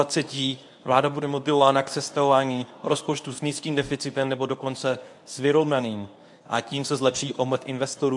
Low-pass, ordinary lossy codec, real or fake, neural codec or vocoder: 10.8 kHz; AAC, 64 kbps; fake; codec, 24 kHz, 0.9 kbps, WavTokenizer, medium speech release version 1